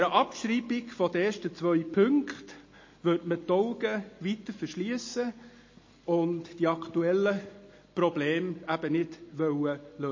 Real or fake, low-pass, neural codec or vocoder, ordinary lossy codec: real; 7.2 kHz; none; MP3, 32 kbps